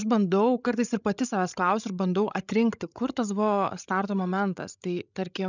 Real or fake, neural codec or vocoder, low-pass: fake; codec, 16 kHz, 16 kbps, FreqCodec, larger model; 7.2 kHz